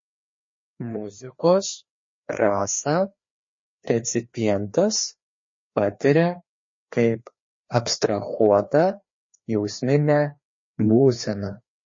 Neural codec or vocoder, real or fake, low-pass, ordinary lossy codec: codec, 16 kHz, 2 kbps, FreqCodec, larger model; fake; 7.2 kHz; MP3, 32 kbps